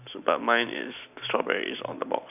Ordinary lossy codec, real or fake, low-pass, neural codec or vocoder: none; real; 3.6 kHz; none